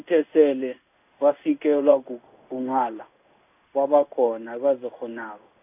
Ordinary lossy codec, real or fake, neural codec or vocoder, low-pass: none; fake; codec, 16 kHz in and 24 kHz out, 1 kbps, XY-Tokenizer; 3.6 kHz